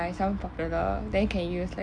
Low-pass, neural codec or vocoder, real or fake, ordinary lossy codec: 9.9 kHz; none; real; MP3, 48 kbps